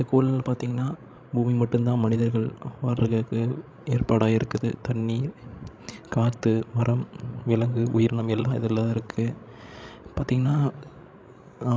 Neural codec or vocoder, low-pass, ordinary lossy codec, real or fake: codec, 16 kHz, 16 kbps, FreqCodec, larger model; none; none; fake